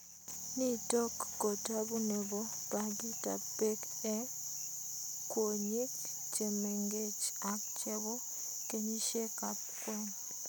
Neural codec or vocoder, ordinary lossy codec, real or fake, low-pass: none; none; real; none